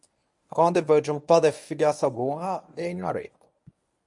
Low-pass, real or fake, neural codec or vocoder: 10.8 kHz; fake; codec, 24 kHz, 0.9 kbps, WavTokenizer, medium speech release version 1